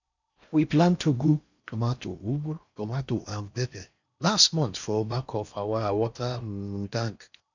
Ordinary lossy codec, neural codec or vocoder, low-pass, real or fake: none; codec, 16 kHz in and 24 kHz out, 0.6 kbps, FocalCodec, streaming, 4096 codes; 7.2 kHz; fake